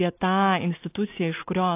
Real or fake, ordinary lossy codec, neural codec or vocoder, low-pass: real; AAC, 24 kbps; none; 3.6 kHz